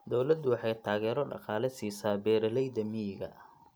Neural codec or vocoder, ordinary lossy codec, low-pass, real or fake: none; none; none; real